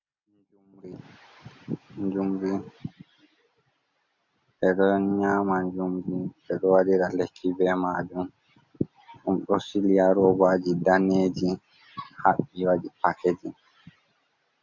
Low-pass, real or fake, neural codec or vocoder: 7.2 kHz; real; none